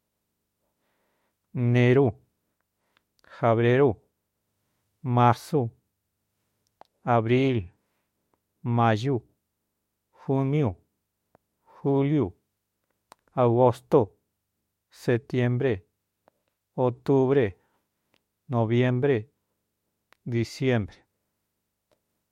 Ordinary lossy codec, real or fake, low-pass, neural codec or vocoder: MP3, 64 kbps; fake; 19.8 kHz; autoencoder, 48 kHz, 32 numbers a frame, DAC-VAE, trained on Japanese speech